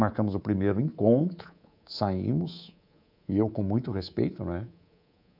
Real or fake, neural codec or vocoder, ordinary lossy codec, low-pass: fake; codec, 24 kHz, 3.1 kbps, DualCodec; none; 5.4 kHz